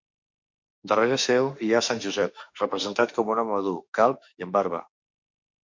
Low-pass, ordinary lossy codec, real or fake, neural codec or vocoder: 7.2 kHz; MP3, 48 kbps; fake; autoencoder, 48 kHz, 32 numbers a frame, DAC-VAE, trained on Japanese speech